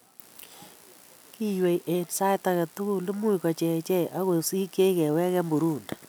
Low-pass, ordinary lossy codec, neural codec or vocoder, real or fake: none; none; none; real